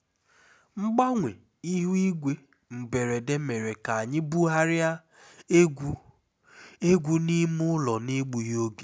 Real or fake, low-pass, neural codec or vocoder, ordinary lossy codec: real; none; none; none